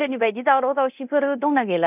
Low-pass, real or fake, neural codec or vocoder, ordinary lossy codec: 3.6 kHz; fake; codec, 24 kHz, 0.9 kbps, DualCodec; none